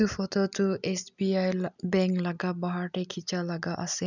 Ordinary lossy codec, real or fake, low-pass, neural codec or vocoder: none; real; 7.2 kHz; none